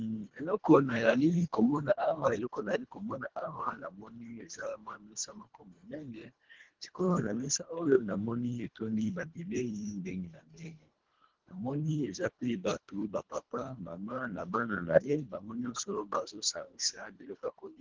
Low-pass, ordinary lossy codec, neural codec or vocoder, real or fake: 7.2 kHz; Opus, 16 kbps; codec, 24 kHz, 1.5 kbps, HILCodec; fake